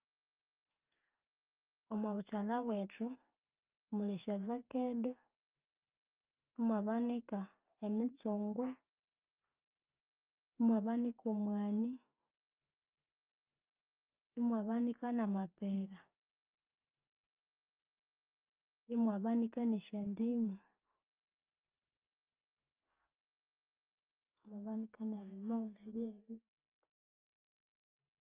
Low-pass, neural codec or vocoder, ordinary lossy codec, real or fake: 3.6 kHz; none; Opus, 24 kbps; real